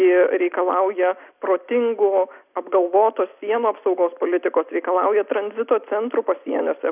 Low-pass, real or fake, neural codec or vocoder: 3.6 kHz; real; none